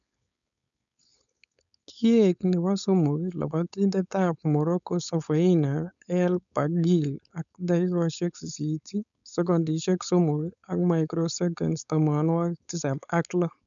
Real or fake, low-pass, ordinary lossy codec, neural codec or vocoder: fake; 7.2 kHz; none; codec, 16 kHz, 4.8 kbps, FACodec